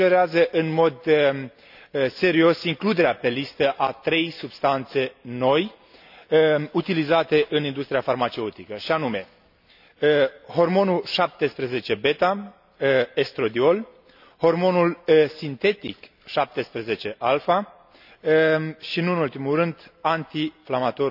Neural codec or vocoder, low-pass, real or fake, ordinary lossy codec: none; 5.4 kHz; real; none